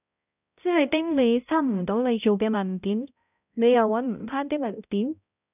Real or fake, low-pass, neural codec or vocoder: fake; 3.6 kHz; codec, 16 kHz, 0.5 kbps, X-Codec, HuBERT features, trained on balanced general audio